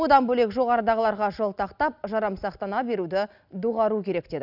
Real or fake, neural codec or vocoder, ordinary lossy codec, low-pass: real; none; none; 5.4 kHz